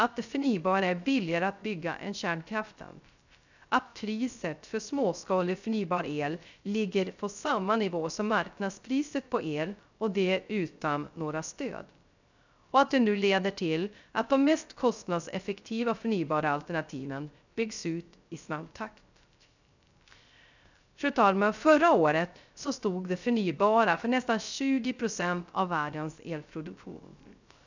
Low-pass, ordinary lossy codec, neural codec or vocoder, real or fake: 7.2 kHz; none; codec, 16 kHz, 0.3 kbps, FocalCodec; fake